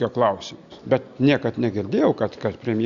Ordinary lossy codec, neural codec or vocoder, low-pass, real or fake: Opus, 64 kbps; none; 7.2 kHz; real